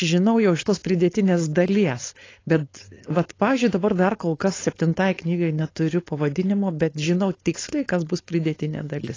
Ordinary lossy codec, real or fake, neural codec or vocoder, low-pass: AAC, 32 kbps; fake; codec, 16 kHz, 4.8 kbps, FACodec; 7.2 kHz